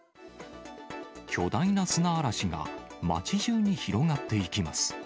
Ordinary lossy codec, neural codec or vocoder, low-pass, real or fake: none; none; none; real